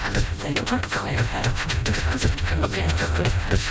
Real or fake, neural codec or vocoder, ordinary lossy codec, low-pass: fake; codec, 16 kHz, 0.5 kbps, FreqCodec, smaller model; none; none